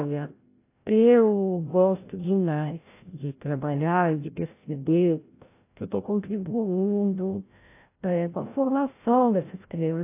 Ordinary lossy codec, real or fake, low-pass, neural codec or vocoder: AAC, 24 kbps; fake; 3.6 kHz; codec, 16 kHz, 0.5 kbps, FreqCodec, larger model